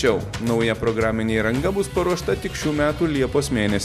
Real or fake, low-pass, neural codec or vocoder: real; 14.4 kHz; none